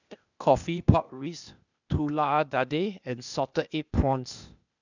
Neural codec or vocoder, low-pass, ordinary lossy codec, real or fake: codec, 16 kHz, 0.8 kbps, ZipCodec; 7.2 kHz; none; fake